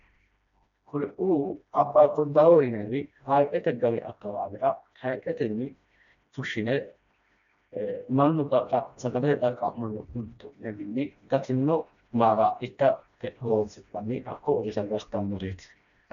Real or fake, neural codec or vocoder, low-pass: fake; codec, 16 kHz, 1 kbps, FreqCodec, smaller model; 7.2 kHz